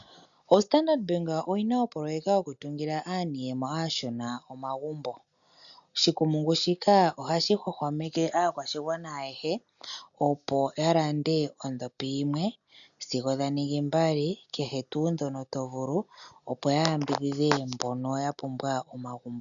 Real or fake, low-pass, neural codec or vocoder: real; 7.2 kHz; none